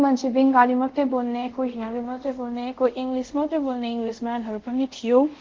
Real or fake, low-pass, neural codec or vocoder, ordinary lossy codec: fake; 7.2 kHz; codec, 24 kHz, 0.5 kbps, DualCodec; Opus, 16 kbps